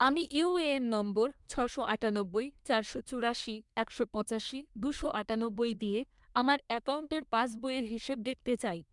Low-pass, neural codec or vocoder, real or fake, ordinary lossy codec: 10.8 kHz; codec, 24 kHz, 1 kbps, SNAC; fake; MP3, 96 kbps